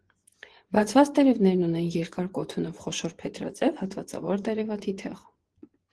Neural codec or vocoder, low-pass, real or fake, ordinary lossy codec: vocoder, 24 kHz, 100 mel bands, Vocos; 10.8 kHz; fake; Opus, 16 kbps